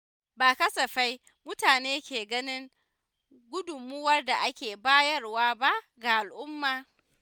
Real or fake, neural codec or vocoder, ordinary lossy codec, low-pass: real; none; none; none